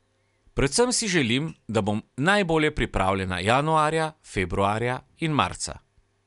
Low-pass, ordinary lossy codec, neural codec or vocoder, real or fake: 10.8 kHz; none; none; real